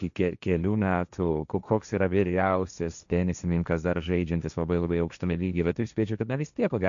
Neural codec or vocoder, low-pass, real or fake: codec, 16 kHz, 1.1 kbps, Voila-Tokenizer; 7.2 kHz; fake